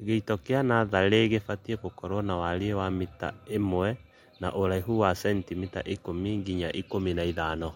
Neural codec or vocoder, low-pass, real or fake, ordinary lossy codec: none; 19.8 kHz; real; MP3, 64 kbps